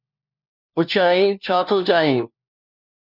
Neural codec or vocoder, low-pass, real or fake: codec, 16 kHz, 1 kbps, FunCodec, trained on LibriTTS, 50 frames a second; 5.4 kHz; fake